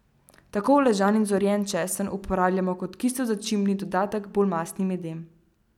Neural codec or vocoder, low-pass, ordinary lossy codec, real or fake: none; 19.8 kHz; none; real